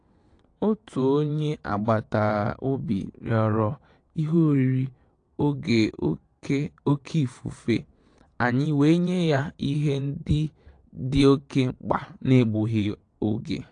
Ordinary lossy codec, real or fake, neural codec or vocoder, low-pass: AAC, 48 kbps; fake; vocoder, 22.05 kHz, 80 mel bands, WaveNeXt; 9.9 kHz